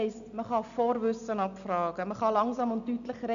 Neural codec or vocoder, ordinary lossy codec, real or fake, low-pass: none; none; real; 7.2 kHz